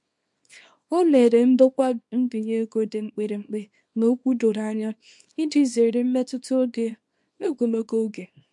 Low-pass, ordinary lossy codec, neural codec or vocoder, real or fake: 10.8 kHz; MP3, 64 kbps; codec, 24 kHz, 0.9 kbps, WavTokenizer, small release; fake